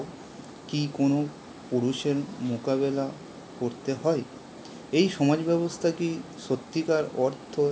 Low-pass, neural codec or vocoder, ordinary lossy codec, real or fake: none; none; none; real